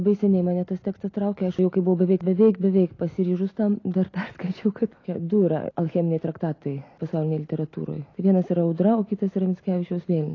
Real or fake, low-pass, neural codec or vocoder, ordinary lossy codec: real; 7.2 kHz; none; AAC, 32 kbps